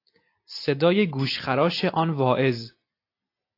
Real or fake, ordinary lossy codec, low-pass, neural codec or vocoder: real; AAC, 32 kbps; 5.4 kHz; none